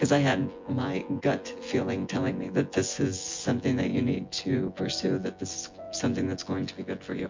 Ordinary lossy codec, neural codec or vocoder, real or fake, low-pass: MP3, 48 kbps; vocoder, 24 kHz, 100 mel bands, Vocos; fake; 7.2 kHz